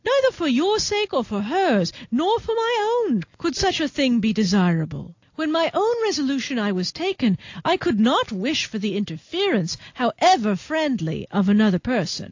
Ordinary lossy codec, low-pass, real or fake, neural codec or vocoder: AAC, 48 kbps; 7.2 kHz; real; none